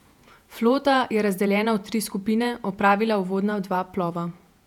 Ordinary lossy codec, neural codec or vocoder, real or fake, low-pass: none; vocoder, 48 kHz, 128 mel bands, Vocos; fake; 19.8 kHz